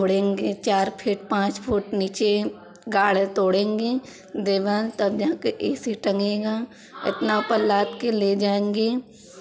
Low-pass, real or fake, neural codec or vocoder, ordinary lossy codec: none; real; none; none